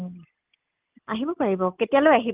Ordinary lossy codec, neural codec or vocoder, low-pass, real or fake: Opus, 24 kbps; none; 3.6 kHz; real